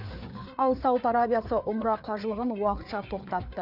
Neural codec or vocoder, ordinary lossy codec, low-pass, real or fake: codec, 16 kHz, 4 kbps, FreqCodec, larger model; none; 5.4 kHz; fake